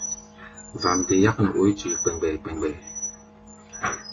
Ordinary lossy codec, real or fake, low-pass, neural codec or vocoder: AAC, 32 kbps; real; 7.2 kHz; none